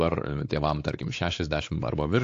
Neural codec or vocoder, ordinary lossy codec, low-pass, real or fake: codec, 16 kHz, 4 kbps, X-Codec, WavLM features, trained on Multilingual LibriSpeech; AAC, 48 kbps; 7.2 kHz; fake